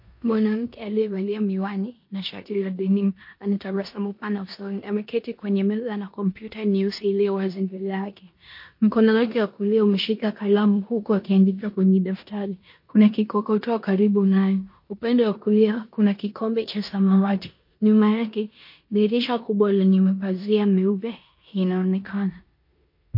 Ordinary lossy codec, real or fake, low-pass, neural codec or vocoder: MP3, 32 kbps; fake; 5.4 kHz; codec, 16 kHz in and 24 kHz out, 0.9 kbps, LongCat-Audio-Codec, four codebook decoder